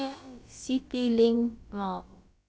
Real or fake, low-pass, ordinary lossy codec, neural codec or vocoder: fake; none; none; codec, 16 kHz, about 1 kbps, DyCAST, with the encoder's durations